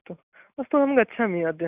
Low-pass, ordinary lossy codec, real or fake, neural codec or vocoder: 3.6 kHz; none; real; none